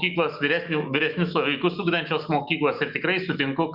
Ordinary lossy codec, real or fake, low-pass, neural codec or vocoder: Opus, 64 kbps; fake; 5.4 kHz; autoencoder, 48 kHz, 128 numbers a frame, DAC-VAE, trained on Japanese speech